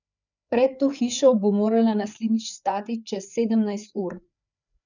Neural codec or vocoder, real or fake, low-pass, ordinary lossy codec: codec, 16 kHz, 4 kbps, FreqCodec, larger model; fake; 7.2 kHz; none